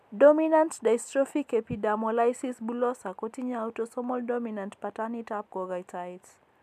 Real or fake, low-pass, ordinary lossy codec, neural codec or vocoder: real; 14.4 kHz; MP3, 96 kbps; none